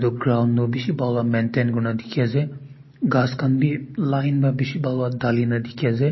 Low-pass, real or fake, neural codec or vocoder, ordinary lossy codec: 7.2 kHz; real; none; MP3, 24 kbps